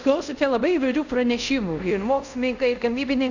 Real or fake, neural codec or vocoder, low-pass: fake; codec, 24 kHz, 0.5 kbps, DualCodec; 7.2 kHz